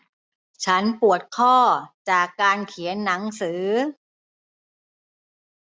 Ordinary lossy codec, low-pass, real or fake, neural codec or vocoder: none; none; real; none